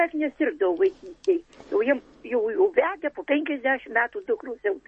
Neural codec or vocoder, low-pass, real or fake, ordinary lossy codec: none; 9.9 kHz; real; MP3, 32 kbps